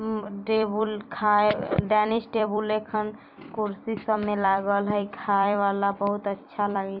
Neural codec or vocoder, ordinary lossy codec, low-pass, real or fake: vocoder, 44.1 kHz, 128 mel bands every 256 samples, BigVGAN v2; none; 5.4 kHz; fake